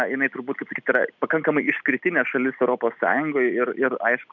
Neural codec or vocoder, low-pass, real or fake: none; 7.2 kHz; real